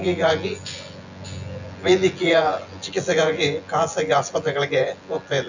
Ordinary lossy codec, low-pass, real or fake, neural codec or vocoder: none; 7.2 kHz; fake; vocoder, 24 kHz, 100 mel bands, Vocos